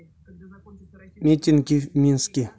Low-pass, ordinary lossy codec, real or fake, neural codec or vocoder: none; none; real; none